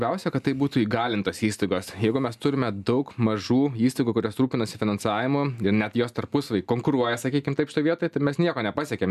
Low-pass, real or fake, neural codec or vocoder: 14.4 kHz; real; none